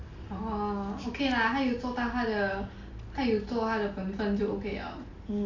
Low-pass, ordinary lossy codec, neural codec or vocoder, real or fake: 7.2 kHz; none; none; real